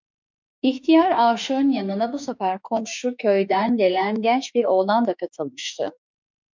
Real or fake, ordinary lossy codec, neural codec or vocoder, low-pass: fake; MP3, 64 kbps; autoencoder, 48 kHz, 32 numbers a frame, DAC-VAE, trained on Japanese speech; 7.2 kHz